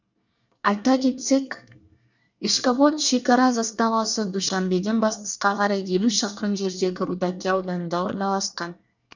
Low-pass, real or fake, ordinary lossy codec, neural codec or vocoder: 7.2 kHz; fake; none; codec, 24 kHz, 1 kbps, SNAC